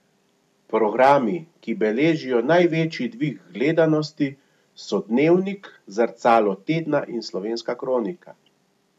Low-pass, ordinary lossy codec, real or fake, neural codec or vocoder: 14.4 kHz; none; real; none